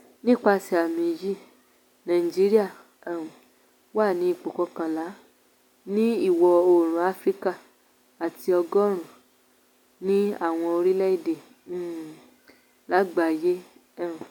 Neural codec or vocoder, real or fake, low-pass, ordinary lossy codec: none; real; 19.8 kHz; none